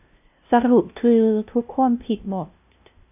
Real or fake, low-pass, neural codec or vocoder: fake; 3.6 kHz; codec, 16 kHz, 0.5 kbps, FunCodec, trained on LibriTTS, 25 frames a second